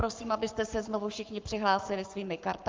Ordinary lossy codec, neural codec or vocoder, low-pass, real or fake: Opus, 32 kbps; codec, 44.1 kHz, 7.8 kbps, Pupu-Codec; 7.2 kHz; fake